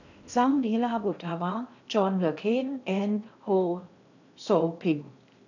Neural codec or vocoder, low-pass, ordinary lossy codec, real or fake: codec, 16 kHz in and 24 kHz out, 0.8 kbps, FocalCodec, streaming, 65536 codes; 7.2 kHz; none; fake